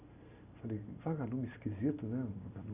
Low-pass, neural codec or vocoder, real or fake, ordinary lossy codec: 3.6 kHz; none; real; none